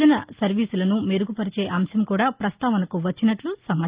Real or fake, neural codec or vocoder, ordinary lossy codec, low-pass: real; none; Opus, 32 kbps; 3.6 kHz